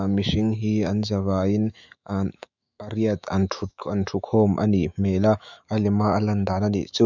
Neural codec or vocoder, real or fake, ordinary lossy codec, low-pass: autoencoder, 48 kHz, 128 numbers a frame, DAC-VAE, trained on Japanese speech; fake; none; 7.2 kHz